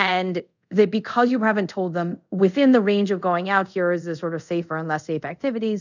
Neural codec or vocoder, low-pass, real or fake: codec, 24 kHz, 0.5 kbps, DualCodec; 7.2 kHz; fake